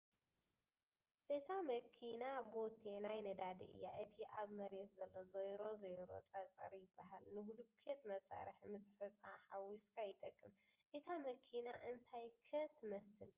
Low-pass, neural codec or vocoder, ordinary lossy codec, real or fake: 3.6 kHz; vocoder, 24 kHz, 100 mel bands, Vocos; Opus, 24 kbps; fake